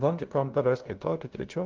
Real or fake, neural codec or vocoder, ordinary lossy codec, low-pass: fake; codec, 16 kHz, 0.5 kbps, FunCodec, trained on LibriTTS, 25 frames a second; Opus, 16 kbps; 7.2 kHz